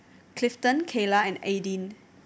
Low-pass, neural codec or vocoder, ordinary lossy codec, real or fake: none; none; none; real